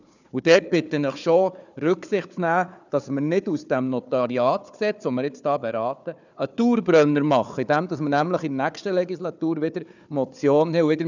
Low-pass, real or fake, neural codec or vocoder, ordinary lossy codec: 7.2 kHz; fake; codec, 16 kHz, 4 kbps, FunCodec, trained on Chinese and English, 50 frames a second; none